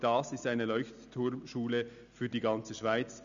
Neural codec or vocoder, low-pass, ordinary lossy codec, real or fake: none; 7.2 kHz; none; real